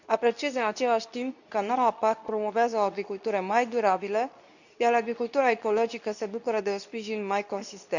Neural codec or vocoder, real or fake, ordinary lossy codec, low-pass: codec, 24 kHz, 0.9 kbps, WavTokenizer, medium speech release version 2; fake; none; 7.2 kHz